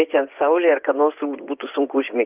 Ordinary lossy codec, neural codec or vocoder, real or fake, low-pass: Opus, 24 kbps; vocoder, 24 kHz, 100 mel bands, Vocos; fake; 3.6 kHz